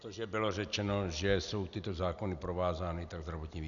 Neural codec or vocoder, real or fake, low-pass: none; real; 7.2 kHz